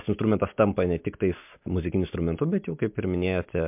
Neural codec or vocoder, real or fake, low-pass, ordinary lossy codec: none; real; 3.6 kHz; MP3, 32 kbps